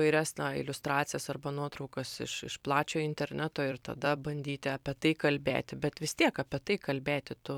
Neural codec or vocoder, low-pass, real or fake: vocoder, 44.1 kHz, 128 mel bands every 256 samples, BigVGAN v2; 19.8 kHz; fake